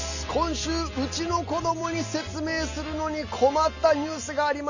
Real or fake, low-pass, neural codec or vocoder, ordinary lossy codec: real; 7.2 kHz; none; none